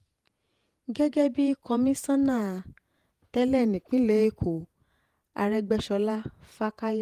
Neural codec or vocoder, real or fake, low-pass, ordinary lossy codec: vocoder, 48 kHz, 128 mel bands, Vocos; fake; 14.4 kHz; Opus, 32 kbps